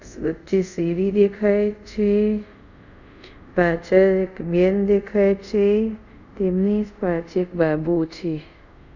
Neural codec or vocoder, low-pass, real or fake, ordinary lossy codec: codec, 24 kHz, 0.5 kbps, DualCodec; 7.2 kHz; fake; none